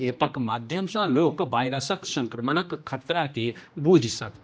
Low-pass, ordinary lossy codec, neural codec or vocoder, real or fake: none; none; codec, 16 kHz, 1 kbps, X-Codec, HuBERT features, trained on general audio; fake